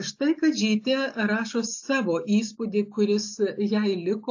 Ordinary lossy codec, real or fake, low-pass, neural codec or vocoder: AAC, 48 kbps; real; 7.2 kHz; none